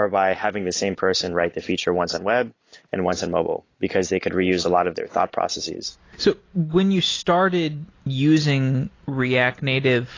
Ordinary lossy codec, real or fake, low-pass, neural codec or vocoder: AAC, 32 kbps; real; 7.2 kHz; none